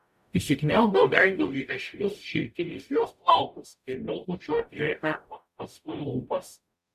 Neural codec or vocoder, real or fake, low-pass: codec, 44.1 kHz, 0.9 kbps, DAC; fake; 14.4 kHz